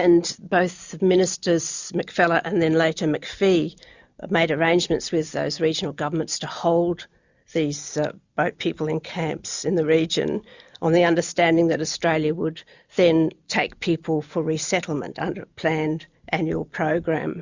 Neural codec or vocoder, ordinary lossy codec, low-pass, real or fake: none; Opus, 64 kbps; 7.2 kHz; real